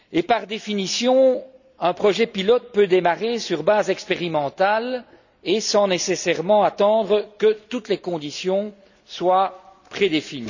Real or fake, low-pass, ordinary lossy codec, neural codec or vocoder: real; 7.2 kHz; none; none